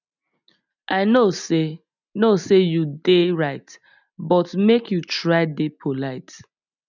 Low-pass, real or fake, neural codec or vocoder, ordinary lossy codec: 7.2 kHz; real; none; none